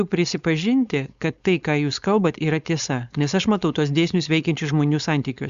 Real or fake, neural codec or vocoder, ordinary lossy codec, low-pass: fake; codec, 16 kHz, 4.8 kbps, FACodec; Opus, 64 kbps; 7.2 kHz